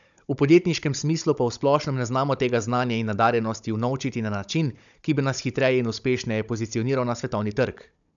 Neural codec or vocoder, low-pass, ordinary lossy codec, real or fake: codec, 16 kHz, 16 kbps, FunCodec, trained on Chinese and English, 50 frames a second; 7.2 kHz; none; fake